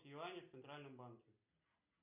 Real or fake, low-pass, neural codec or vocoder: real; 3.6 kHz; none